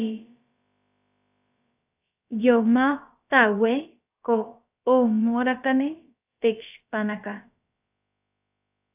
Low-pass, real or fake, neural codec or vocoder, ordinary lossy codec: 3.6 kHz; fake; codec, 16 kHz, about 1 kbps, DyCAST, with the encoder's durations; AAC, 32 kbps